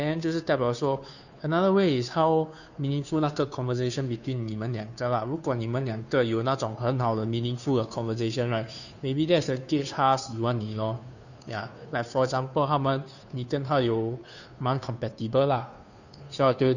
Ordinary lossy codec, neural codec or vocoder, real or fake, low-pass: none; codec, 16 kHz, 2 kbps, FunCodec, trained on Chinese and English, 25 frames a second; fake; 7.2 kHz